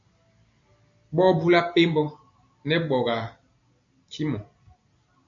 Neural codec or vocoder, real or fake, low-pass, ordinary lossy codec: none; real; 7.2 kHz; AAC, 64 kbps